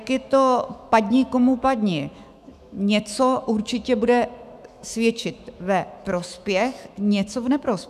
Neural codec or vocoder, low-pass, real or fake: autoencoder, 48 kHz, 128 numbers a frame, DAC-VAE, trained on Japanese speech; 14.4 kHz; fake